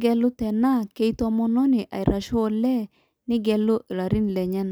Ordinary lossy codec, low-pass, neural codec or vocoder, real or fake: none; none; none; real